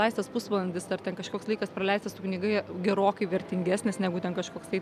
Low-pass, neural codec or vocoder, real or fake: 14.4 kHz; none; real